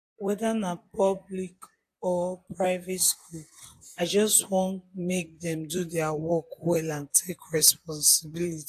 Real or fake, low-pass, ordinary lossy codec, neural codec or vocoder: fake; 14.4 kHz; AAC, 64 kbps; vocoder, 44.1 kHz, 128 mel bands, Pupu-Vocoder